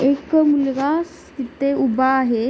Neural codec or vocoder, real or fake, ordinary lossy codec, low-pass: none; real; none; none